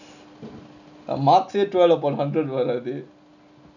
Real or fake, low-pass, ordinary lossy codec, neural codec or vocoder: real; 7.2 kHz; none; none